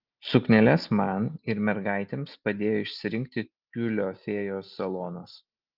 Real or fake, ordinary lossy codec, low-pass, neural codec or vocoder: real; Opus, 32 kbps; 5.4 kHz; none